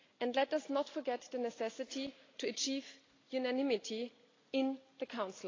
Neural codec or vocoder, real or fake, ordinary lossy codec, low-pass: none; real; AAC, 32 kbps; 7.2 kHz